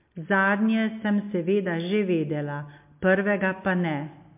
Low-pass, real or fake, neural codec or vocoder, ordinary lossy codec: 3.6 kHz; real; none; MP3, 32 kbps